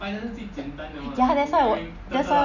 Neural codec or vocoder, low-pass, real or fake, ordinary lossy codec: none; 7.2 kHz; real; none